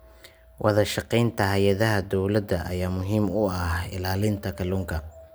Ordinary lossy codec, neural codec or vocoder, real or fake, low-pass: none; none; real; none